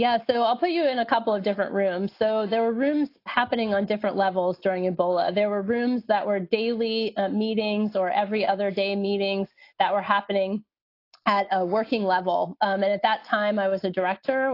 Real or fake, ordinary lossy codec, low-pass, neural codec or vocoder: real; AAC, 32 kbps; 5.4 kHz; none